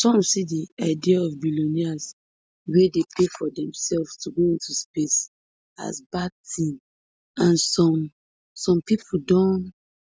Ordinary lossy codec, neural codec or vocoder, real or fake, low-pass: none; none; real; none